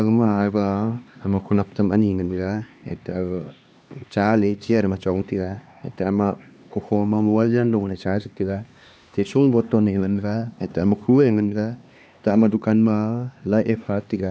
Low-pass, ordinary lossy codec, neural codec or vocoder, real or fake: none; none; codec, 16 kHz, 2 kbps, X-Codec, HuBERT features, trained on LibriSpeech; fake